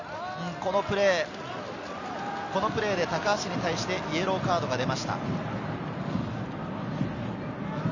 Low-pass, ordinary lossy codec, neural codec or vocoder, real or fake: 7.2 kHz; none; none; real